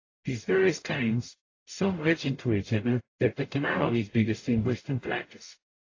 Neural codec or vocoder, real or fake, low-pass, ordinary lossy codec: codec, 44.1 kHz, 0.9 kbps, DAC; fake; 7.2 kHz; AAC, 32 kbps